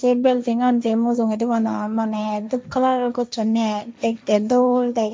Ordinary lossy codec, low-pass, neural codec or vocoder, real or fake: none; none; codec, 16 kHz, 1.1 kbps, Voila-Tokenizer; fake